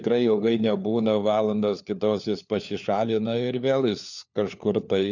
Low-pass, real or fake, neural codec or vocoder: 7.2 kHz; fake; codec, 16 kHz, 4 kbps, FunCodec, trained on LibriTTS, 50 frames a second